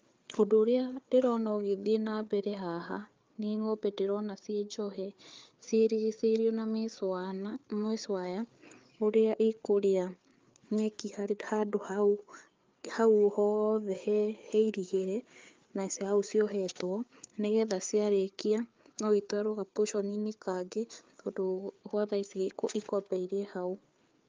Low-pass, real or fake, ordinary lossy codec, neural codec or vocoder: 7.2 kHz; fake; Opus, 24 kbps; codec, 16 kHz, 4 kbps, FreqCodec, larger model